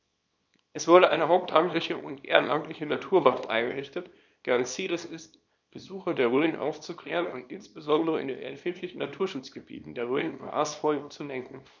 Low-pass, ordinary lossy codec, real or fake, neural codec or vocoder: 7.2 kHz; none; fake; codec, 24 kHz, 0.9 kbps, WavTokenizer, small release